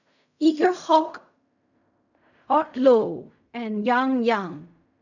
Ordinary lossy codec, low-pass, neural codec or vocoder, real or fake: none; 7.2 kHz; codec, 16 kHz in and 24 kHz out, 0.4 kbps, LongCat-Audio-Codec, fine tuned four codebook decoder; fake